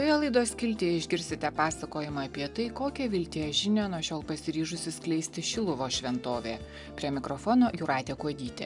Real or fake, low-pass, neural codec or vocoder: real; 10.8 kHz; none